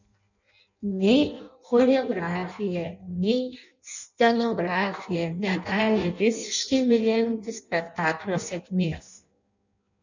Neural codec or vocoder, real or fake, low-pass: codec, 16 kHz in and 24 kHz out, 0.6 kbps, FireRedTTS-2 codec; fake; 7.2 kHz